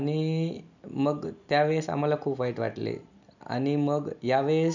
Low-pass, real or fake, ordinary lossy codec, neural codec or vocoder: 7.2 kHz; real; none; none